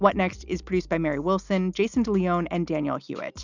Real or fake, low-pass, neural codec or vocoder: real; 7.2 kHz; none